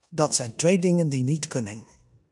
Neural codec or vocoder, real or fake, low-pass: codec, 16 kHz in and 24 kHz out, 0.9 kbps, LongCat-Audio-Codec, four codebook decoder; fake; 10.8 kHz